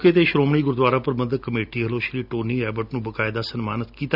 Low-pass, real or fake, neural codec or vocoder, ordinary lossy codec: 5.4 kHz; real; none; none